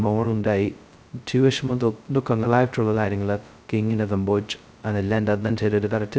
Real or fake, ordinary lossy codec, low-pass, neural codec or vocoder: fake; none; none; codec, 16 kHz, 0.2 kbps, FocalCodec